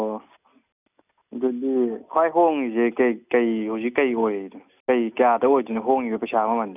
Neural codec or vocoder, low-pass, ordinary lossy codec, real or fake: none; 3.6 kHz; none; real